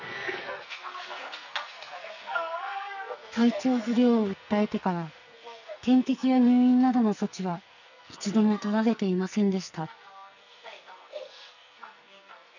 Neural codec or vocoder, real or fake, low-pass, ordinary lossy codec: codec, 44.1 kHz, 2.6 kbps, SNAC; fake; 7.2 kHz; none